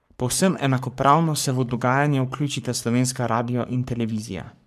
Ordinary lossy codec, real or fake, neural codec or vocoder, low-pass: none; fake; codec, 44.1 kHz, 3.4 kbps, Pupu-Codec; 14.4 kHz